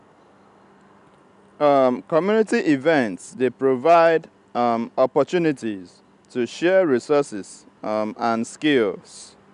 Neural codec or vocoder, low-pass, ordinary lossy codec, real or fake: none; 10.8 kHz; none; real